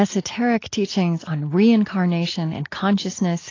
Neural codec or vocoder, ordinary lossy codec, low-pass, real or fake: none; AAC, 32 kbps; 7.2 kHz; real